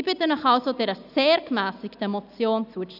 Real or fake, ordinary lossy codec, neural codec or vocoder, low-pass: real; none; none; 5.4 kHz